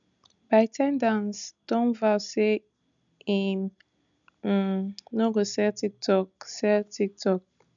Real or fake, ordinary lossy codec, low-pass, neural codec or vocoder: real; none; 7.2 kHz; none